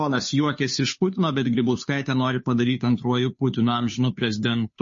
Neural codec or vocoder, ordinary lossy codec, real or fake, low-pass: codec, 16 kHz, 2 kbps, FunCodec, trained on Chinese and English, 25 frames a second; MP3, 32 kbps; fake; 7.2 kHz